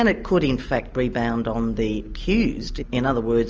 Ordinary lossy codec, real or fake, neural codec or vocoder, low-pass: Opus, 24 kbps; real; none; 7.2 kHz